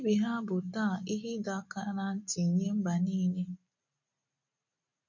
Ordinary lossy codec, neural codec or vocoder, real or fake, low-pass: none; none; real; 7.2 kHz